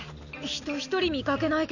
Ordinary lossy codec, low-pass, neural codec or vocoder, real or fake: none; 7.2 kHz; none; real